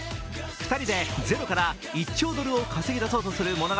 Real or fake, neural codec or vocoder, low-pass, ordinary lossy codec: real; none; none; none